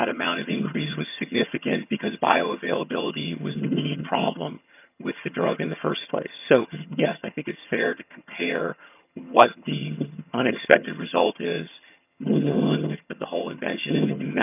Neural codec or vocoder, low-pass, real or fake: vocoder, 22.05 kHz, 80 mel bands, HiFi-GAN; 3.6 kHz; fake